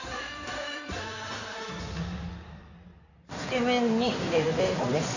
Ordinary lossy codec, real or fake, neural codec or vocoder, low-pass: none; fake; codec, 16 kHz in and 24 kHz out, 2.2 kbps, FireRedTTS-2 codec; 7.2 kHz